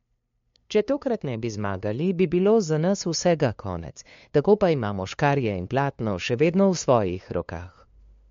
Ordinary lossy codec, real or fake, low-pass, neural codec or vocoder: MP3, 48 kbps; fake; 7.2 kHz; codec, 16 kHz, 2 kbps, FunCodec, trained on LibriTTS, 25 frames a second